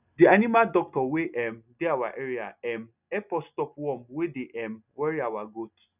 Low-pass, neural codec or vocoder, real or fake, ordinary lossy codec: 3.6 kHz; none; real; none